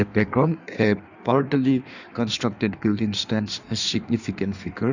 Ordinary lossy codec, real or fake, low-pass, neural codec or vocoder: none; fake; 7.2 kHz; codec, 16 kHz in and 24 kHz out, 1.1 kbps, FireRedTTS-2 codec